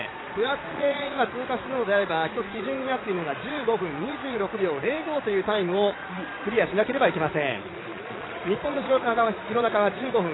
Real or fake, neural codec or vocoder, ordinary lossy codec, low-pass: fake; vocoder, 22.05 kHz, 80 mel bands, Vocos; AAC, 16 kbps; 7.2 kHz